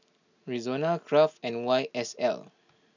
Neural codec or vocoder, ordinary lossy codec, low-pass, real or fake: none; none; 7.2 kHz; real